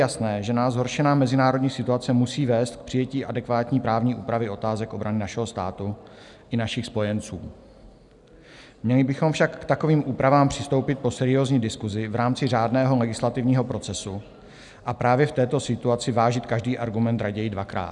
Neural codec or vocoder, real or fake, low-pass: none; real; 10.8 kHz